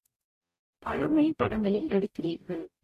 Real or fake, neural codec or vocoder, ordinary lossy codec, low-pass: fake; codec, 44.1 kHz, 0.9 kbps, DAC; AAC, 64 kbps; 14.4 kHz